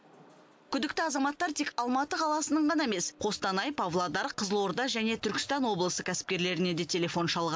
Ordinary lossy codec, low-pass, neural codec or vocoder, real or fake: none; none; none; real